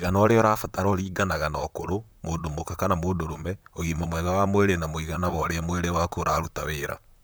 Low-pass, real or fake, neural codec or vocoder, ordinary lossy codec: none; fake; vocoder, 44.1 kHz, 128 mel bands, Pupu-Vocoder; none